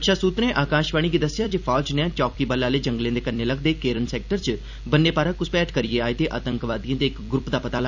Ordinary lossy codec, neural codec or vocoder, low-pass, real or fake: none; vocoder, 44.1 kHz, 128 mel bands every 256 samples, BigVGAN v2; 7.2 kHz; fake